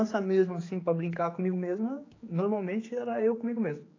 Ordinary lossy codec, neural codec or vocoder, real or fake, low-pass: AAC, 32 kbps; codec, 16 kHz, 4 kbps, X-Codec, HuBERT features, trained on general audio; fake; 7.2 kHz